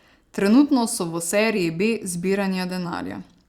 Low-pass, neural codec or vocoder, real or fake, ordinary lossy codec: 19.8 kHz; vocoder, 44.1 kHz, 128 mel bands every 256 samples, BigVGAN v2; fake; Opus, 64 kbps